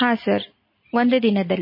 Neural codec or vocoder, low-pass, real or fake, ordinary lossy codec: none; 5.4 kHz; real; MP3, 24 kbps